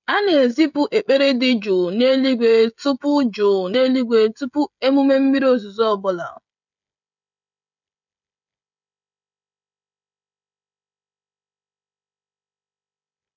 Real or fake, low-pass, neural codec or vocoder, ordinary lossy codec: fake; 7.2 kHz; codec, 16 kHz, 16 kbps, FreqCodec, smaller model; none